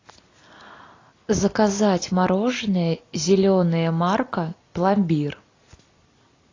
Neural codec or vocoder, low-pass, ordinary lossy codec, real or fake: none; 7.2 kHz; AAC, 32 kbps; real